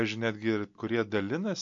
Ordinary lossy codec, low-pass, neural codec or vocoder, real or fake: AAC, 64 kbps; 7.2 kHz; none; real